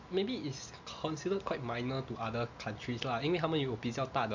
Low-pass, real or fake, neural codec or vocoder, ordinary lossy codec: 7.2 kHz; real; none; MP3, 64 kbps